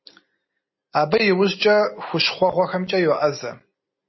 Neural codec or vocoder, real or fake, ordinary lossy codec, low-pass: none; real; MP3, 24 kbps; 7.2 kHz